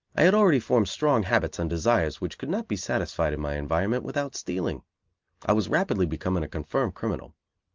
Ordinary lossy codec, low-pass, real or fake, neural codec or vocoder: Opus, 24 kbps; 7.2 kHz; real; none